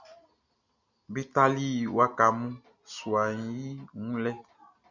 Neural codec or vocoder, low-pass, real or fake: none; 7.2 kHz; real